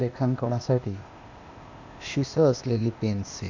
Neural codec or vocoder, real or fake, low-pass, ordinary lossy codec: codec, 16 kHz, 0.8 kbps, ZipCodec; fake; 7.2 kHz; none